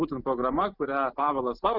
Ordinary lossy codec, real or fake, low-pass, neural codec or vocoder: Opus, 64 kbps; real; 5.4 kHz; none